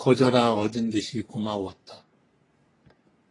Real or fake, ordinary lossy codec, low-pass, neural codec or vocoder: fake; AAC, 32 kbps; 10.8 kHz; codec, 44.1 kHz, 3.4 kbps, Pupu-Codec